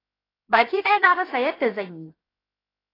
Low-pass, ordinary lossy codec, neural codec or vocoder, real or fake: 5.4 kHz; AAC, 24 kbps; codec, 16 kHz, 0.7 kbps, FocalCodec; fake